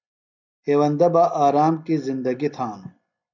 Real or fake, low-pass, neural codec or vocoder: real; 7.2 kHz; none